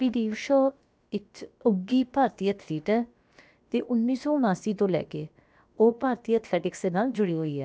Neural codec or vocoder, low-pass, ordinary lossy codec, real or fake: codec, 16 kHz, about 1 kbps, DyCAST, with the encoder's durations; none; none; fake